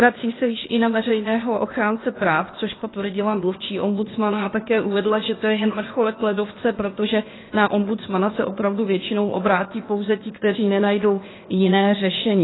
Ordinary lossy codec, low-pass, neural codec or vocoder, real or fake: AAC, 16 kbps; 7.2 kHz; codec, 16 kHz, 0.8 kbps, ZipCodec; fake